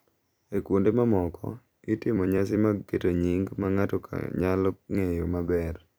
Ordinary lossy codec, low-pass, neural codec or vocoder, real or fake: none; none; vocoder, 44.1 kHz, 128 mel bands every 256 samples, BigVGAN v2; fake